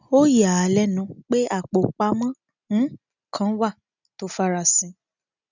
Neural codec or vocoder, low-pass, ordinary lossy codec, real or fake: none; 7.2 kHz; none; real